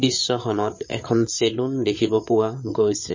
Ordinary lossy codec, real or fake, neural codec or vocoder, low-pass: MP3, 32 kbps; fake; codec, 16 kHz, 8 kbps, FreqCodec, larger model; 7.2 kHz